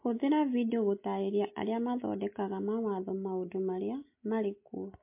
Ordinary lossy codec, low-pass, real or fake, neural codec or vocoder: MP3, 24 kbps; 3.6 kHz; real; none